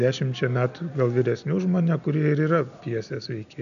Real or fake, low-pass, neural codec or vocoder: real; 7.2 kHz; none